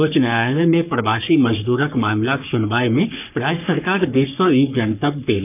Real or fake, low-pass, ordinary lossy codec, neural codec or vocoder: fake; 3.6 kHz; none; codec, 44.1 kHz, 3.4 kbps, Pupu-Codec